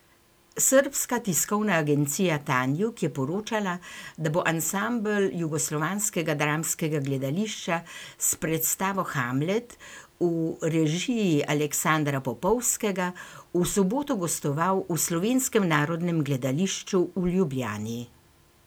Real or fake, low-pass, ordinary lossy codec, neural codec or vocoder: real; none; none; none